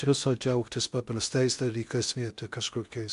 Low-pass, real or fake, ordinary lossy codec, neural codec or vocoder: 10.8 kHz; fake; MP3, 64 kbps; codec, 16 kHz in and 24 kHz out, 0.6 kbps, FocalCodec, streaming, 2048 codes